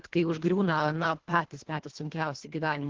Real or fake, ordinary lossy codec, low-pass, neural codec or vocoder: fake; Opus, 16 kbps; 7.2 kHz; codec, 24 kHz, 1.5 kbps, HILCodec